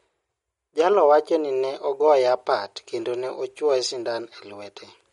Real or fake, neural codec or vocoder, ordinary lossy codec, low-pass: real; none; MP3, 48 kbps; 14.4 kHz